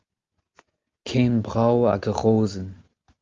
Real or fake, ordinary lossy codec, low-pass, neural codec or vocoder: real; Opus, 32 kbps; 7.2 kHz; none